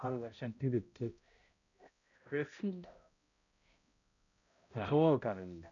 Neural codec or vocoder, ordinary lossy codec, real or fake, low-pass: codec, 16 kHz, 0.5 kbps, X-Codec, HuBERT features, trained on balanced general audio; none; fake; 7.2 kHz